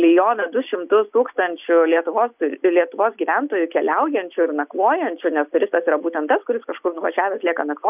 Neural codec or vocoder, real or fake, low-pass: none; real; 3.6 kHz